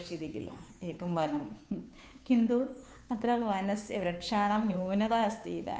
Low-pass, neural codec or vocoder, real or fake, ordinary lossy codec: none; codec, 16 kHz, 2 kbps, FunCodec, trained on Chinese and English, 25 frames a second; fake; none